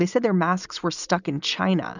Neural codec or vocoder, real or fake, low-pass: none; real; 7.2 kHz